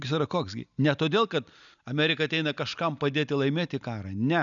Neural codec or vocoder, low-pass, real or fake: none; 7.2 kHz; real